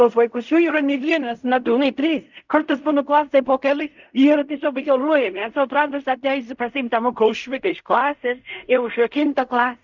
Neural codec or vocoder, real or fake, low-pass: codec, 16 kHz in and 24 kHz out, 0.4 kbps, LongCat-Audio-Codec, fine tuned four codebook decoder; fake; 7.2 kHz